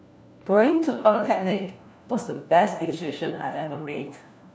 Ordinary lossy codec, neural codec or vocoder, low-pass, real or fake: none; codec, 16 kHz, 1 kbps, FunCodec, trained on LibriTTS, 50 frames a second; none; fake